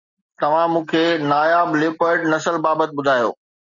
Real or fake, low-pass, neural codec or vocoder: real; 7.2 kHz; none